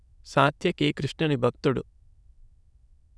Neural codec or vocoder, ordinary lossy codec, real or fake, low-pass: autoencoder, 22.05 kHz, a latent of 192 numbers a frame, VITS, trained on many speakers; none; fake; none